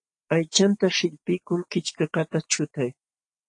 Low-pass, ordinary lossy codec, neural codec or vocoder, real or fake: 10.8 kHz; AAC, 48 kbps; none; real